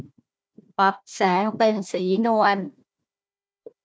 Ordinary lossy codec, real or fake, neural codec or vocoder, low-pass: none; fake; codec, 16 kHz, 1 kbps, FunCodec, trained on Chinese and English, 50 frames a second; none